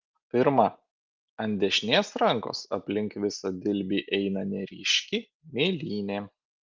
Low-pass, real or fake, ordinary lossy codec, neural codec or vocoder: 7.2 kHz; real; Opus, 32 kbps; none